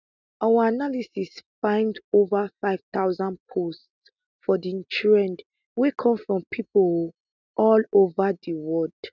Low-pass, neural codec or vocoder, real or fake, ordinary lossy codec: 7.2 kHz; none; real; none